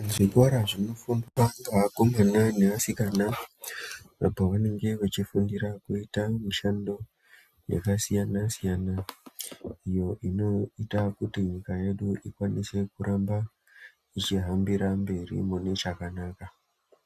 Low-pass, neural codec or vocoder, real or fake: 14.4 kHz; none; real